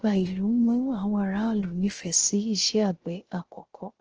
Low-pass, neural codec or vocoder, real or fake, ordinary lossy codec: 7.2 kHz; codec, 16 kHz, 0.3 kbps, FocalCodec; fake; Opus, 16 kbps